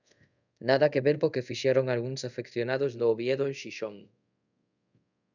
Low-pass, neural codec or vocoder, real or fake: 7.2 kHz; codec, 24 kHz, 0.5 kbps, DualCodec; fake